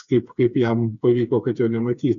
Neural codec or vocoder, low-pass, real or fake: codec, 16 kHz, 4 kbps, FreqCodec, smaller model; 7.2 kHz; fake